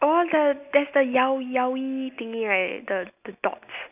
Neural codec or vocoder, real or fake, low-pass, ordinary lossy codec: none; real; 3.6 kHz; none